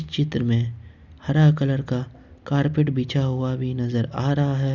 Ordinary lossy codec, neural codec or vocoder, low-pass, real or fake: none; none; 7.2 kHz; real